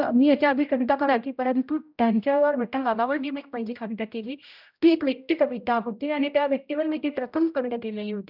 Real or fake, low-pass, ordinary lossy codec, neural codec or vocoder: fake; 5.4 kHz; none; codec, 16 kHz, 0.5 kbps, X-Codec, HuBERT features, trained on general audio